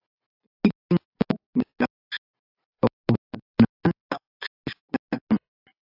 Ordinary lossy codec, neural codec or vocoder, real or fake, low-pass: AAC, 48 kbps; none; real; 5.4 kHz